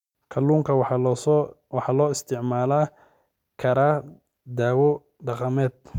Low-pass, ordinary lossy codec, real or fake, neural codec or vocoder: 19.8 kHz; none; real; none